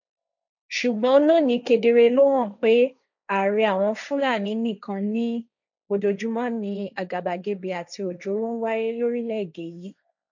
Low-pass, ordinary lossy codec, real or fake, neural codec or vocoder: 7.2 kHz; none; fake; codec, 16 kHz, 1.1 kbps, Voila-Tokenizer